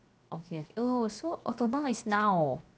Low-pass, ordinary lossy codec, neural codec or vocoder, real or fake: none; none; codec, 16 kHz, 0.7 kbps, FocalCodec; fake